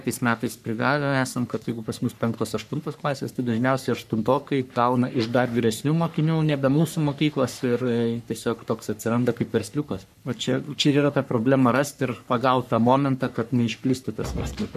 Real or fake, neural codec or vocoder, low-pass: fake; codec, 44.1 kHz, 3.4 kbps, Pupu-Codec; 14.4 kHz